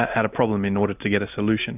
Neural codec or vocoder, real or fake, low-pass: none; real; 3.6 kHz